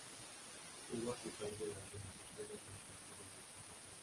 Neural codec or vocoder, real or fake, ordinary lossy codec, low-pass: none; real; Opus, 24 kbps; 10.8 kHz